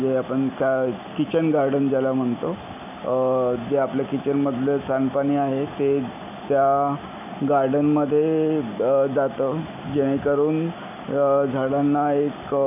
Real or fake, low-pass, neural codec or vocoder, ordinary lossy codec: real; 3.6 kHz; none; MP3, 24 kbps